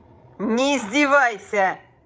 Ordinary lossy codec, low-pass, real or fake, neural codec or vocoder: none; none; fake; codec, 16 kHz, 16 kbps, FreqCodec, larger model